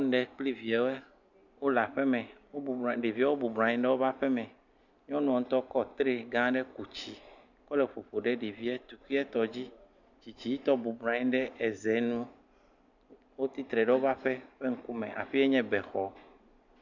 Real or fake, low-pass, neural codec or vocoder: real; 7.2 kHz; none